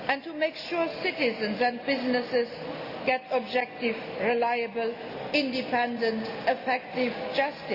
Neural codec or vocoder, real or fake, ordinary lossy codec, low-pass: none; real; Opus, 64 kbps; 5.4 kHz